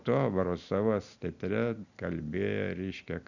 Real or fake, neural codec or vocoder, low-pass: real; none; 7.2 kHz